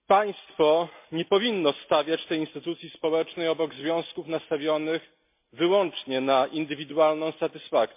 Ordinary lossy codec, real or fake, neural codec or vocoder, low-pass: MP3, 32 kbps; real; none; 3.6 kHz